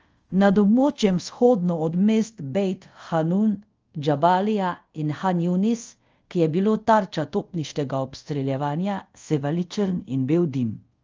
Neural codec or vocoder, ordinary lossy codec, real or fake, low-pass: codec, 24 kHz, 0.5 kbps, DualCodec; Opus, 24 kbps; fake; 7.2 kHz